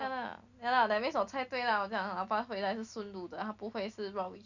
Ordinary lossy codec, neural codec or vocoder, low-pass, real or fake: none; none; 7.2 kHz; real